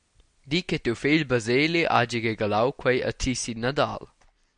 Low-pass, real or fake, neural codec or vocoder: 9.9 kHz; real; none